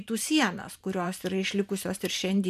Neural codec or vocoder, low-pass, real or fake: vocoder, 44.1 kHz, 128 mel bands every 512 samples, BigVGAN v2; 14.4 kHz; fake